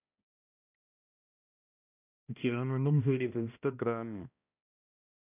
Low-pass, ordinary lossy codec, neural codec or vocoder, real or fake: 3.6 kHz; none; codec, 16 kHz, 1 kbps, X-Codec, HuBERT features, trained on balanced general audio; fake